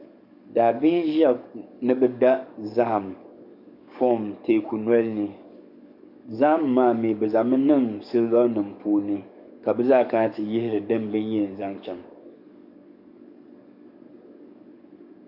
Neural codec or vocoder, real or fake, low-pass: codec, 44.1 kHz, 7.8 kbps, DAC; fake; 5.4 kHz